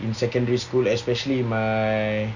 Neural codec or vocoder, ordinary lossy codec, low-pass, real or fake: none; none; 7.2 kHz; real